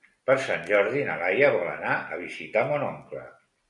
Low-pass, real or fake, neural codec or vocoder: 10.8 kHz; real; none